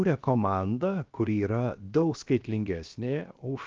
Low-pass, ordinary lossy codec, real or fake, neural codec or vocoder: 7.2 kHz; Opus, 24 kbps; fake; codec, 16 kHz, 0.7 kbps, FocalCodec